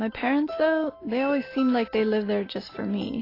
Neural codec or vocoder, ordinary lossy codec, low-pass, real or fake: none; AAC, 24 kbps; 5.4 kHz; real